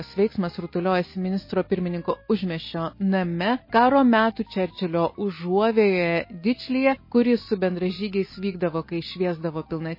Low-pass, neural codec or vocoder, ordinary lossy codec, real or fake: 5.4 kHz; none; MP3, 24 kbps; real